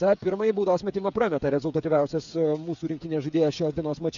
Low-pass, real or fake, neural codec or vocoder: 7.2 kHz; fake; codec, 16 kHz, 8 kbps, FreqCodec, smaller model